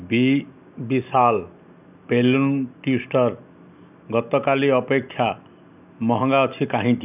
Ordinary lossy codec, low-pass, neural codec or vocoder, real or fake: none; 3.6 kHz; none; real